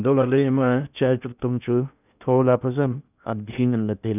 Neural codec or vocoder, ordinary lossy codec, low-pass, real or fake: codec, 16 kHz in and 24 kHz out, 0.6 kbps, FocalCodec, streaming, 2048 codes; none; 3.6 kHz; fake